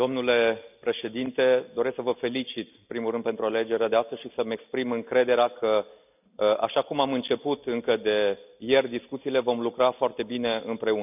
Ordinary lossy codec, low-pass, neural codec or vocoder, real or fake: none; 3.6 kHz; none; real